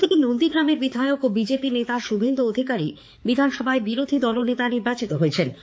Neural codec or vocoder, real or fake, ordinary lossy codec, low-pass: codec, 16 kHz, 4 kbps, X-Codec, HuBERT features, trained on balanced general audio; fake; none; none